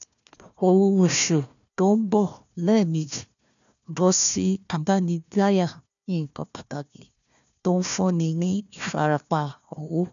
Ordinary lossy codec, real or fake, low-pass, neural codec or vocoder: none; fake; 7.2 kHz; codec, 16 kHz, 1 kbps, FunCodec, trained on Chinese and English, 50 frames a second